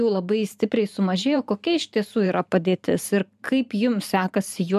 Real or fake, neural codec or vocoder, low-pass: fake; vocoder, 44.1 kHz, 128 mel bands every 512 samples, BigVGAN v2; 14.4 kHz